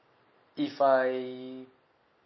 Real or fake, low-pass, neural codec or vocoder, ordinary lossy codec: real; 7.2 kHz; none; MP3, 24 kbps